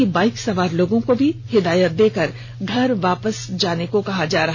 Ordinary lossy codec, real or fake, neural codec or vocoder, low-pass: none; real; none; none